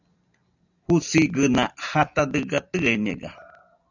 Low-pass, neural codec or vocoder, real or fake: 7.2 kHz; none; real